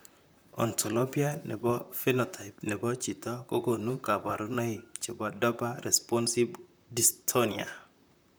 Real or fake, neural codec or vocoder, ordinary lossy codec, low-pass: fake; vocoder, 44.1 kHz, 128 mel bands, Pupu-Vocoder; none; none